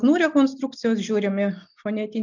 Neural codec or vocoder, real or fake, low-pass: none; real; 7.2 kHz